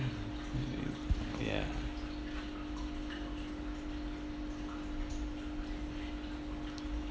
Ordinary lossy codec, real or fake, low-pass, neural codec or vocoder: none; real; none; none